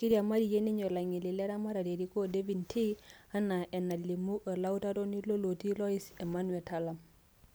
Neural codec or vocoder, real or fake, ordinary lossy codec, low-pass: none; real; none; none